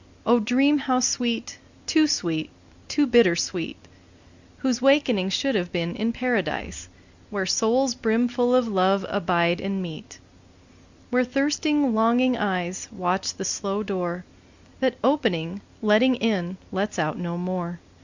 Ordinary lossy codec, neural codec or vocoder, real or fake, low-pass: Opus, 64 kbps; none; real; 7.2 kHz